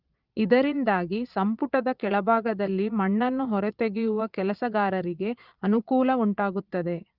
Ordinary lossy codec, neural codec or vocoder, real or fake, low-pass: Opus, 24 kbps; vocoder, 22.05 kHz, 80 mel bands, Vocos; fake; 5.4 kHz